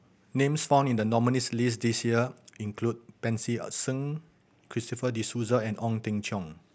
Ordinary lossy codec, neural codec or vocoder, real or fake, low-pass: none; none; real; none